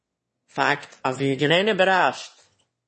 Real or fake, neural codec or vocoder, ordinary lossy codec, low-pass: fake; autoencoder, 22.05 kHz, a latent of 192 numbers a frame, VITS, trained on one speaker; MP3, 32 kbps; 9.9 kHz